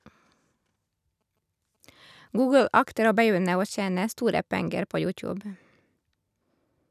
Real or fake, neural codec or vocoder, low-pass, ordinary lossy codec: real; none; 14.4 kHz; none